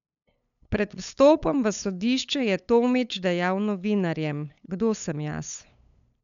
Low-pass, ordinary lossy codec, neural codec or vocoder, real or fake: 7.2 kHz; none; codec, 16 kHz, 8 kbps, FunCodec, trained on LibriTTS, 25 frames a second; fake